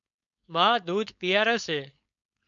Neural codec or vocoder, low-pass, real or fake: codec, 16 kHz, 4.8 kbps, FACodec; 7.2 kHz; fake